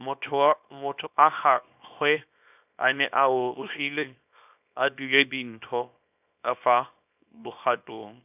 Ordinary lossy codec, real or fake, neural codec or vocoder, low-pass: none; fake; codec, 24 kHz, 0.9 kbps, WavTokenizer, small release; 3.6 kHz